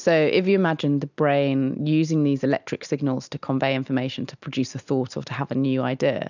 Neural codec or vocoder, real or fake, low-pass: none; real; 7.2 kHz